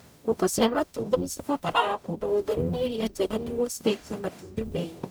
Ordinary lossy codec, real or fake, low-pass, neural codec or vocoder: none; fake; none; codec, 44.1 kHz, 0.9 kbps, DAC